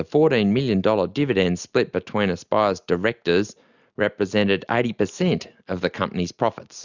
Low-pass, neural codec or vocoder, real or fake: 7.2 kHz; none; real